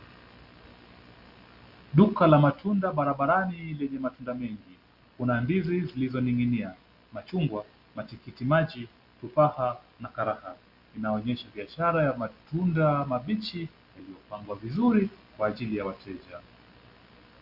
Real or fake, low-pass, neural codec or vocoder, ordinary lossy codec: real; 5.4 kHz; none; Opus, 64 kbps